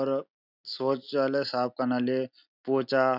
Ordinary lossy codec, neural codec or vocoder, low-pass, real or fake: none; none; 5.4 kHz; real